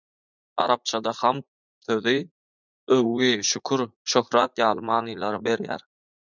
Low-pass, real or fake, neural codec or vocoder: 7.2 kHz; fake; vocoder, 44.1 kHz, 80 mel bands, Vocos